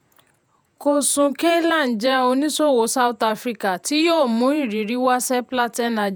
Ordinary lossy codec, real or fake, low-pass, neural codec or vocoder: none; fake; none; vocoder, 48 kHz, 128 mel bands, Vocos